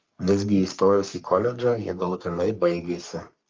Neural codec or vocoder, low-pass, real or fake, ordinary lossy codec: codec, 44.1 kHz, 3.4 kbps, Pupu-Codec; 7.2 kHz; fake; Opus, 24 kbps